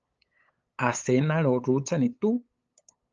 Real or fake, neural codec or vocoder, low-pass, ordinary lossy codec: fake; codec, 16 kHz, 8 kbps, FunCodec, trained on LibriTTS, 25 frames a second; 7.2 kHz; Opus, 24 kbps